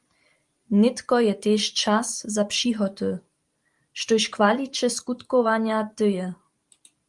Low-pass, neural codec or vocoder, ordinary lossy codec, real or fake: 10.8 kHz; vocoder, 44.1 kHz, 128 mel bands every 512 samples, BigVGAN v2; Opus, 32 kbps; fake